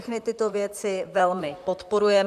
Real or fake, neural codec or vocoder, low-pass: fake; vocoder, 44.1 kHz, 128 mel bands, Pupu-Vocoder; 14.4 kHz